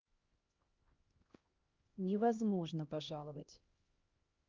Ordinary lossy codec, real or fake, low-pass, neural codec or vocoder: Opus, 24 kbps; fake; 7.2 kHz; codec, 16 kHz, 1 kbps, X-Codec, HuBERT features, trained on LibriSpeech